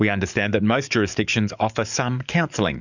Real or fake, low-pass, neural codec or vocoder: fake; 7.2 kHz; codec, 44.1 kHz, 7.8 kbps, DAC